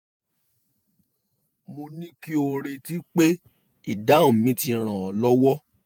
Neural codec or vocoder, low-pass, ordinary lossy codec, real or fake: vocoder, 48 kHz, 128 mel bands, Vocos; none; none; fake